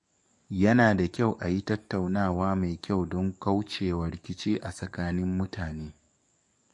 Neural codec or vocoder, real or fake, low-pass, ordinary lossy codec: codec, 44.1 kHz, 7.8 kbps, DAC; fake; 10.8 kHz; MP3, 48 kbps